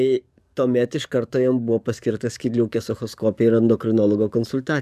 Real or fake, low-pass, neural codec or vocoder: fake; 14.4 kHz; codec, 44.1 kHz, 7.8 kbps, DAC